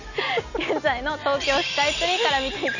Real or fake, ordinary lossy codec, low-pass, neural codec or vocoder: real; none; 7.2 kHz; none